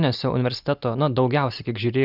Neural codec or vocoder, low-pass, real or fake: none; 5.4 kHz; real